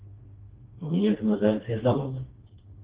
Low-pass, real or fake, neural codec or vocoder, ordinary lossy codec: 3.6 kHz; fake; codec, 16 kHz, 2 kbps, FreqCodec, smaller model; Opus, 16 kbps